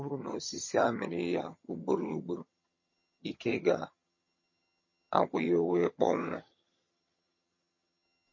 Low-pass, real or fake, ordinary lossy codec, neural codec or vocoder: 7.2 kHz; fake; MP3, 32 kbps; vocoder, 22.05 kHz, 80 mel bands, HiFi-GAN